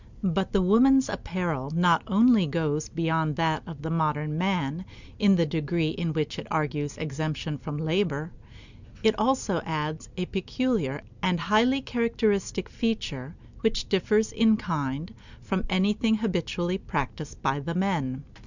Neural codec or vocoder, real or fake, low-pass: none; real; 7.2 kHz